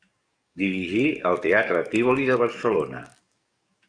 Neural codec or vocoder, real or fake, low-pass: codec, 44.1 kHz, 7.8 kbps, DAC; fake; 9.9 kHz